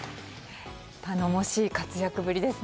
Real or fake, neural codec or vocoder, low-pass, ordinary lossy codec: real; none; none; none